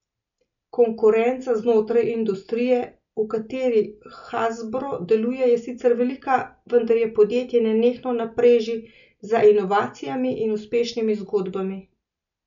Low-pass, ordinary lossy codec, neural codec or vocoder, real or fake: 7.2 kHz; none; none; real